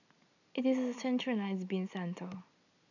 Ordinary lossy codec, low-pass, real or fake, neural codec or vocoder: none; 7.2 kHz; real; none